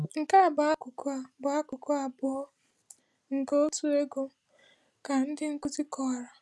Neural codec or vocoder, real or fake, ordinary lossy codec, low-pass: none; real; none; none